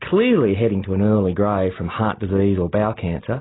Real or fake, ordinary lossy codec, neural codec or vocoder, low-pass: real; AAC, 16 kbps; none; 7.2 kHz